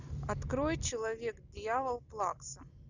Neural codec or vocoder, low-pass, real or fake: none; 7.2 kHz; real